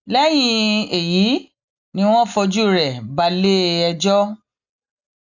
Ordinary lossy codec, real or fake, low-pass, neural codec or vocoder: none; real; 7.2 kHz; none